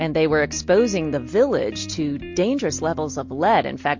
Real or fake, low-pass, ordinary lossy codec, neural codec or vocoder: real; 7.2 kHz; MP3, 48 kbps; none